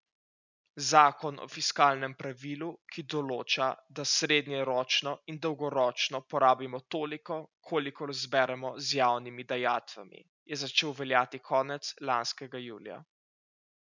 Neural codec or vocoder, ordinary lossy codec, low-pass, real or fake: none; none; 7.2 kHz; real